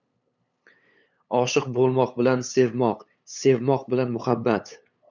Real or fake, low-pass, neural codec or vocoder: fake; 7.2 kHz; codec, 16 kHz, 8 kbps, FunCodec, trained on LibriTTS, 25 frames a second